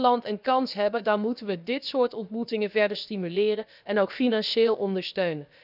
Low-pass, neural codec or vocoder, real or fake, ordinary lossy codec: 5.4 kHz; codec, 16 kHz, about 1 kbps, DyCAST, with the encoder's durations; fake; none